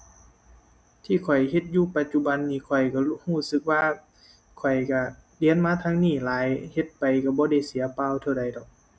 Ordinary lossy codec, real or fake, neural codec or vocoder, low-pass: none; real; none; none